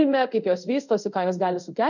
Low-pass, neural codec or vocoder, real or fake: 7.2 kHz; codec, 24 kHz, 0.5 kbps, DualCodec; fake